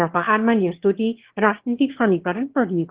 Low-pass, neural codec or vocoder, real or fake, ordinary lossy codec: 3.6 kHz; autoencoder, 22.05 kHz, a latent of 192 numbers a frame, VITS, trained on one speaker; fake; Opus, 16 kbps